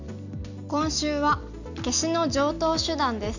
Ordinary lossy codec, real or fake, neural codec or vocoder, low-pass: none; real; none; 7.2 kHz